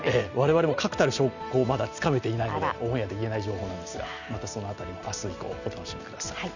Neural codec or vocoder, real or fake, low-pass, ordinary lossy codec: none; real; 7.2 kHz; none